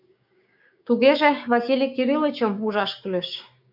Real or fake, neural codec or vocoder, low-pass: fake; codec, 16 kHz, 6 kbps, DAC; 5.4 kHz